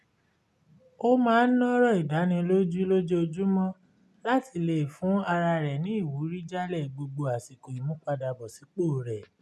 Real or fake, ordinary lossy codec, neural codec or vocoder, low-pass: real; none; none; none